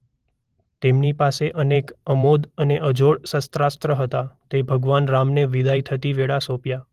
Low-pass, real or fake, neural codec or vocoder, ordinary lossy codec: 14.4 kHz; fake; vocoder, 44.1 kHz, 128 mel bands every 512 samples, BigVGAN v2; Opus, 24 kbps